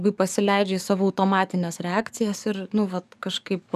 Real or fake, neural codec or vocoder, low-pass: fake; codec, 44.1 kHz, 7.8 kbps, DAC; 14.4 kHz